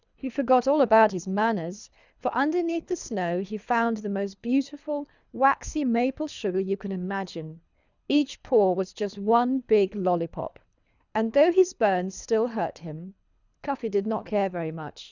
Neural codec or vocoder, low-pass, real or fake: codec, 24 kHz, 3 kbps, HILCodec; 7.2 kHz; fake